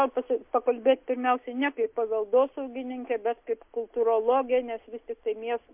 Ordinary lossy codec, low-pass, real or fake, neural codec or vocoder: MP3, 32 kbps; 3.6 kHz; real; none